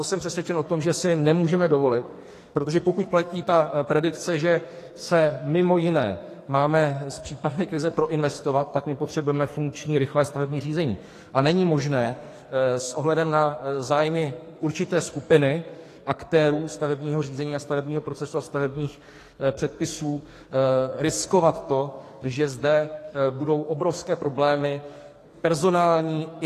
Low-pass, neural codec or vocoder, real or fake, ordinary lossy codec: 14.4 kHz; codec, 44.1 kHz, 2.6 kbps, SNAC; fake; AAC, 48 kbps